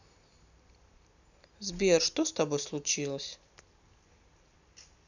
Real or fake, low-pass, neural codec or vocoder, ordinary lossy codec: real; 7.2 kHz; none; none